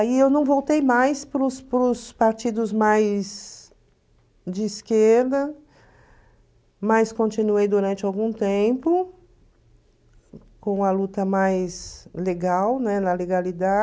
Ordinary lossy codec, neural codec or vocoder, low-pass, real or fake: none; none; none; real